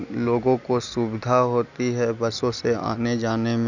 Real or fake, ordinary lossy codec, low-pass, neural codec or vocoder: real; none; 7.2 kHz; none